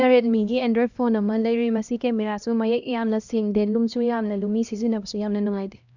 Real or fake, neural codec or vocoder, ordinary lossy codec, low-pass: fake; codec, 16 kHz, 1 kbps, X-Codec, HuBERT features, trained on LibriSpeech; none; 7.2 kHz